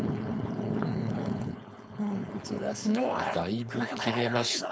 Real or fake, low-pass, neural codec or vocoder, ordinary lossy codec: fake; none; codec, 16 kHz, 4.8 kbps, FACodec; none